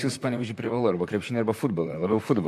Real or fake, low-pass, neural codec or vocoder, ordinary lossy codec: fake; 14.4 kHz; vocoder, 44.1 kHz, 128 mel bands, Pupu-Vocoder; MP3, 96 kbps